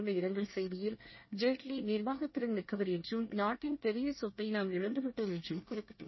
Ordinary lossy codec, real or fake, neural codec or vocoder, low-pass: MP3, 24 kbps; fake; codec, 24 kHz, 1 kbps, SNAC; 7.2 kHz